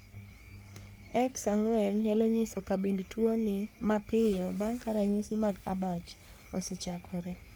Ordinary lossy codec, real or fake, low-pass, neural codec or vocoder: none; fake; none; codec, 44.1 kHz, 3.4 kbps, Pupu-Codec